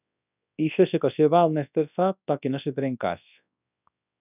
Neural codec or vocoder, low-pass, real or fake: codec, 24 kHz, 0.9 kbps, WavTokenizer, large speech release; 3.6 kHz; fake